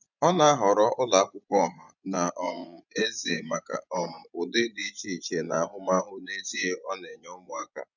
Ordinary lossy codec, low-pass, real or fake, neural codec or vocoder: none; 7.2 kHz; fake; vocoder, 24 kHz, 100 mel bands, Vocos